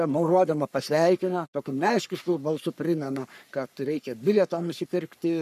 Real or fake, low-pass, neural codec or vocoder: fake; 14.4 kHz; codec, 44.1 kHz, 3.4 kbps, Pupu-Codec